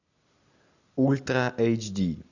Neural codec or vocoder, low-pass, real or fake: none; 7.2 kHz; real